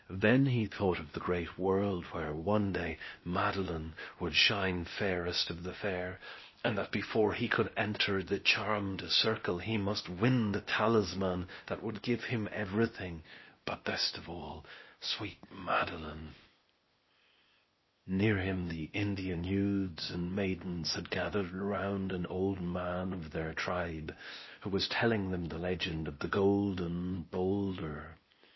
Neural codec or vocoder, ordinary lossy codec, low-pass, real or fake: codec, 16 kHz, 0.8 kbps, ZipCodec; MP3, 24 kbps; 7.2 kHz; fake